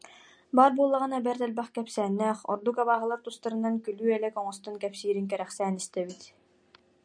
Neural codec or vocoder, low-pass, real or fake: none; 9.9 kHz; real